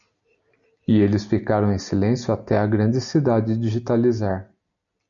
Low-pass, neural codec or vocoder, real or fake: 7.2 kHz; none; real